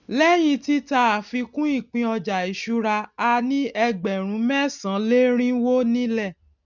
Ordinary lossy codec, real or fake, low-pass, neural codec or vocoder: none; real; 7.2 kHz; none